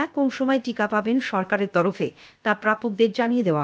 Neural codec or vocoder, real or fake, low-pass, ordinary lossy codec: codec, 16 kHz, 0.7 kbps, FocalCodec; fake; none; none